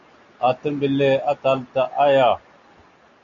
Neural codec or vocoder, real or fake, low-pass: none; real; 7.2 kHz